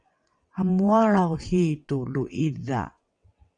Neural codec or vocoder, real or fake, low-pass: vocoder, 22.05 kHz, 80 mel bands, WaveNeXt; fake; 9.9 kHz